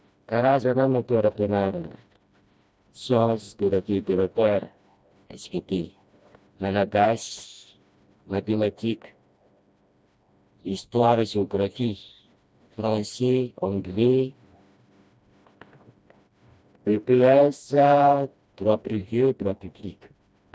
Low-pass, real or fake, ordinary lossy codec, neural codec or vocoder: none; fake; none; codec, 16 kHz, 1 kbps, FreqCodec, smaller model